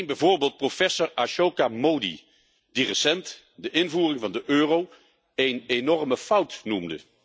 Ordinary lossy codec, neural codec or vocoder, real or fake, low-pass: none; none; real; none